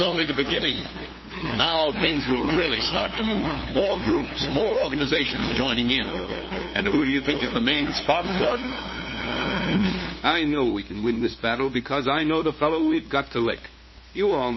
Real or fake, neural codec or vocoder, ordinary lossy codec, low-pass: fake; codec, 16 kHz, 2 kbps, FunCodec, trained on LibriTTS, 25 frames a second; MP3, 24 kbps; 7.2 kHz